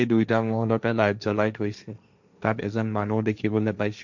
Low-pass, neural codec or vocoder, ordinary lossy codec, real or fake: 7.2 kHz; codec, 16 kHz, 1.1 kbps, Voila-Tokenizer; none; fake